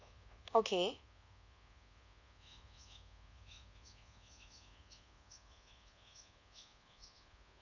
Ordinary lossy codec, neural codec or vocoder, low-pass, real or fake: none; codec, 24 kHz, 1.2 kbps, DualCodec; 7.2 kHz; fake